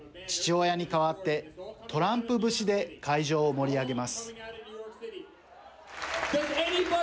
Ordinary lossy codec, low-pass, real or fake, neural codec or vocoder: none; none; real; none